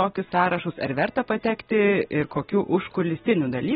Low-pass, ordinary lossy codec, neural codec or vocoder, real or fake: 19.8 kHz; AAC, 16 kbps; none; real